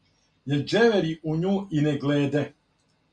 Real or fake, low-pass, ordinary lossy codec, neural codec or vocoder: real; 9.9 kHz; Opus, 64 kbps; none